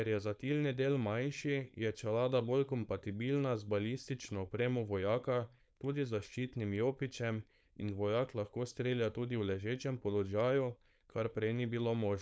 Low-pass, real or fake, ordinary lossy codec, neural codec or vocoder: none; fake; none; codec, 16 kHz, 4.8 kbps, FACodec